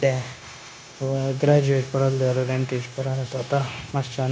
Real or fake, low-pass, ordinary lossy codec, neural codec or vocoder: fake; none; none; codec, 16 kHz, 0.9 kbps, LongCat-Audio-Codec